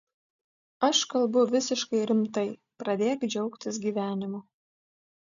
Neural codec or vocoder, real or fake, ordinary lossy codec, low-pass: none; real; MP3, 96 kbps; 7.2 kHz